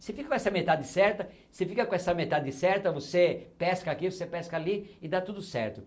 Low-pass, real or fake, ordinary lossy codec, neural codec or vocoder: none; real; none; none